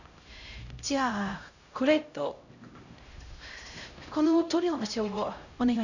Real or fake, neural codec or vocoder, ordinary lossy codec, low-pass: fake; codec, 16 kHz, 0.5 kbps, X-Codec, HuBERT features, trained on LibriSpeech; none; 7.2 kHz